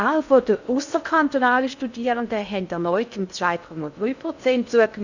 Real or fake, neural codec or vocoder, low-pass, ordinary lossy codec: fake; codec, 16 kHz in and 24 kHz out, 0.6 kbps, FocalCodec, streaming, 2048 codes; 7.2 kHz; none